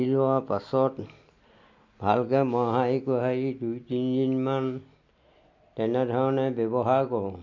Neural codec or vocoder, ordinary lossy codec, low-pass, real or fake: none; MP3, 48 kbps; 7.2 kHz; real